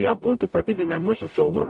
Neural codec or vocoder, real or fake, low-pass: codec, 44.1 kHz, 0.9 kbps, DAC; fake; 10.8 kHz